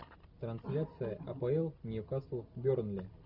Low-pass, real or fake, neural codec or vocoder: 5.4 kHz; real; none